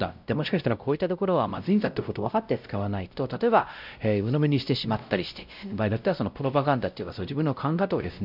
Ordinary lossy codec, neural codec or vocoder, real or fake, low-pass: none; codec, 16 kHz, 0.5 kbps, X-Codec, WavLM features, trained on Multilingual LibriSpeech; fake; 5.4 kHz